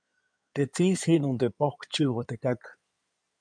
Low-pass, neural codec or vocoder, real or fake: 9.9 kHz; codec, 16 kHz in and 24 kHz out, 2.2 kbps, FireRedTTS-2 codec; fake